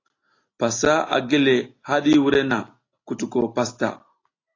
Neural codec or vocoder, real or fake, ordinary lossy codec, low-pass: none; real; AAC, 32 kbps; 7.2 kHz